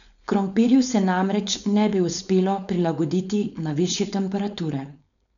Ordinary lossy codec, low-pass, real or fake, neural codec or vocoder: none; 7.2 kHz; fake; codec, 16 kHz, 4.8 kbps, FACodec